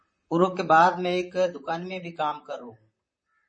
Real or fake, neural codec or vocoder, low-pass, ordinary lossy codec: fake; vocoder, 44.1 kHz, 128 mel bands, Pupu-Vocoder; 9.9 kHz; MP3, 32 kbps